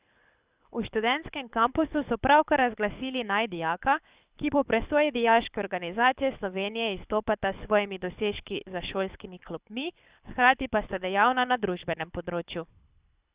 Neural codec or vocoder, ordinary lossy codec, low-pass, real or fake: codec, 24 kHz, 6 kbps, HILCodec; Opus, 64 kbps; 3.6 kHz; fake